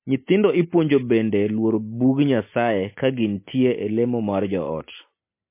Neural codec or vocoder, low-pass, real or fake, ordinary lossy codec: none; 3.6 kHz; real; MP3, 24 kbps